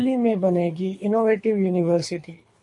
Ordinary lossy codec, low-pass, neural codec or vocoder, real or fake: MP3, 48 kbps; 10.8 kHz; codec, 24 kHz, 3 kbps, HILCodec; fake